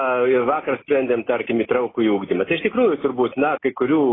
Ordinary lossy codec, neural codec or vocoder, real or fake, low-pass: AAC, 16 kbps; none; real; 7.2 kHz